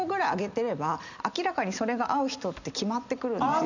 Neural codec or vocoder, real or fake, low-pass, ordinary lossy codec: none; real; 7.2 kHz; none